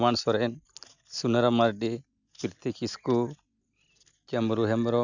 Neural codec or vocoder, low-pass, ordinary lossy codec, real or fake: none; 7.2 kHz; none; real